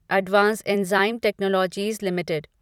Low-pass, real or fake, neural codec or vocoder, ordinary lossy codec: 19.8 kHz; fake; vocoder, 44.1 kHz, 128 mel bands every 256 samples, BigVGAN v2; none